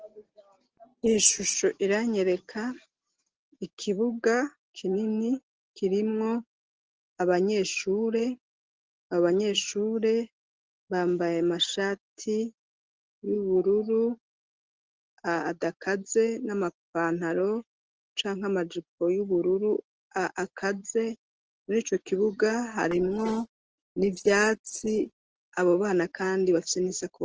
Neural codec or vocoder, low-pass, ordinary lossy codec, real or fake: none; 7.2 kHz; Opus, 16 kbps; real